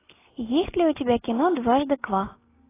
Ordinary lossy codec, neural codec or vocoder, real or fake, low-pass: AAC, 16 kbps; none; real; 3.6 kHz